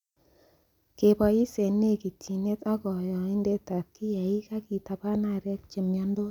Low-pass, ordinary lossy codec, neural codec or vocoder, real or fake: 19.8 kHz; none; none; real